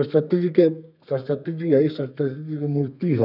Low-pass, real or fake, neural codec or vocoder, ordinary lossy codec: 5.4 kHz; fake; codec, 32 kHz, 1.9 kbps, SNAC; none